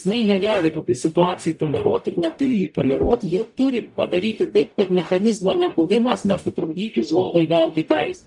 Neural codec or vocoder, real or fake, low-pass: codec, 44.1 kHz, 0.9 kbps, DAC; fake; 10.8 kHz